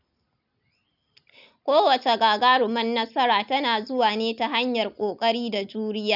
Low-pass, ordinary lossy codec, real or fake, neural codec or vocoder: 5.4 kHz; none; real; none